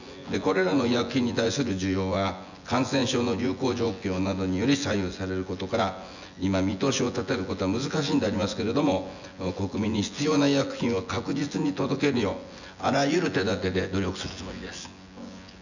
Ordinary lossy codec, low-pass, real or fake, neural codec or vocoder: none; 7.2 kHz; fake; vocoder, 24 kHz, 100 mel bands, Vocos